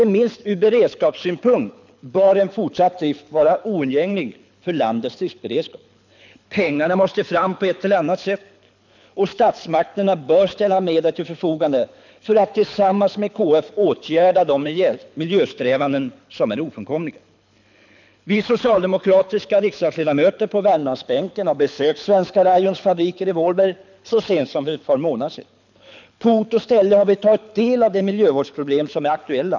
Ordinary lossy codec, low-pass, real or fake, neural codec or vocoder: none; 7.2 kHz; fake; codec, 24 kHz, 6 kbps, HILCodec